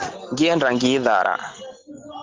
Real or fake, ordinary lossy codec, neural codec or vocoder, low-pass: real; Opus, 16 kbps; none; 7.2 kHz